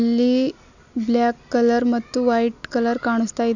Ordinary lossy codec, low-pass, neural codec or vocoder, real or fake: none; 7.2 kHz; none; real